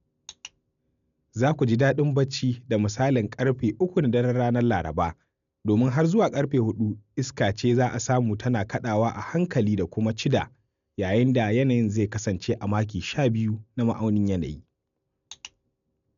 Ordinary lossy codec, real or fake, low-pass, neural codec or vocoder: MP3, 96 kbps; real; 7.2 kHz; none